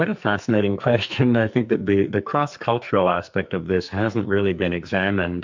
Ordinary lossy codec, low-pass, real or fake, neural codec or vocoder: MP3, 64 kbps; 7.2 kHz; fake; codec, 44.1 kHz, 2.6 kbps, SNAC